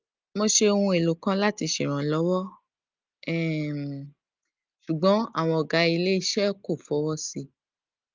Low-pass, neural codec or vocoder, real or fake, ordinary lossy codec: 7.2 kHz; none; real; Opus, 32 kbps